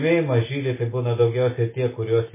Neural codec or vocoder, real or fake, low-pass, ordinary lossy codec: none; real; 3.6 kHz; MP3, 16 kbps